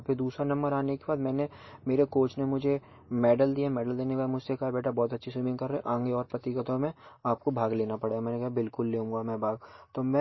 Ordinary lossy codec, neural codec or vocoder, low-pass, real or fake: MP3, 24 kbps; none; 7.2 kHz; real